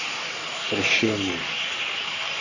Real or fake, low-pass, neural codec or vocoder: fake; 7.2 kHz; codec, 44.1 kHz, 3.4 kbps, Pupu-Codec